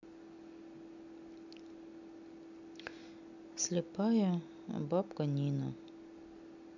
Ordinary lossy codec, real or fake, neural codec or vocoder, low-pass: none; real; none; 7.2 kHz